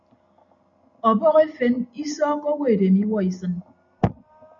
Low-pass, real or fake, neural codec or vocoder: 7.2 kHz; real; none